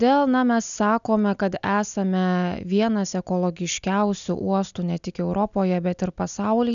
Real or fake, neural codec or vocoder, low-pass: real; none; 7.2 kHz